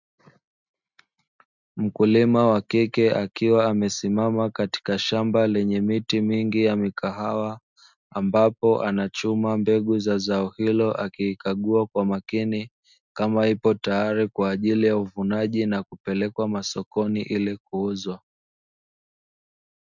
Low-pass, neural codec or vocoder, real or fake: 7.2 kHz; none; real